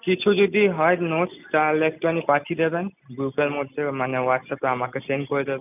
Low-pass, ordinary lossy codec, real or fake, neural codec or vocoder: 3.6 kHz; none; real; none